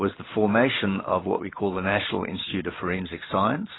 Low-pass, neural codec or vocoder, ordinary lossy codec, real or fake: 7.2 kHz; vocoder, 44.1 kHz, 128 mel bands every 512 samples, BigVGAN v2; AAC, 16 kbps; fake